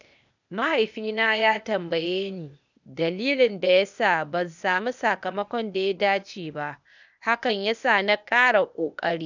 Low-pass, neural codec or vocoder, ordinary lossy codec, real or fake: 7.2 kHz; codec, 16 kHz, 0.8 kbps, ZipCodec; none; fake